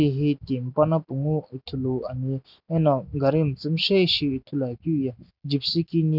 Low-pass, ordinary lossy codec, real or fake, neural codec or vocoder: 5.4 kHz; none; real; none